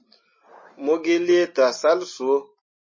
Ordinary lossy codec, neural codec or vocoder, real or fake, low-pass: MP3, 32 kbps; none; real; 7.2 kHz